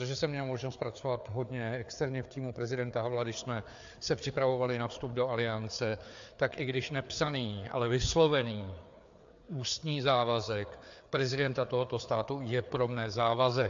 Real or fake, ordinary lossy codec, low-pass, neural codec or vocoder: fake; AAC, 64 kbps; 7.2 kHz; codec, 16 kHz, 4 kbps, FreqCodec, larger model